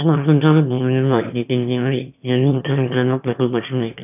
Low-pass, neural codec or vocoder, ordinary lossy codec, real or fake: 3.6 kHz; autoencoder, 22.05 kHz, a latent of 192 numbers a frame, VITS, trained on one speaker; none; fake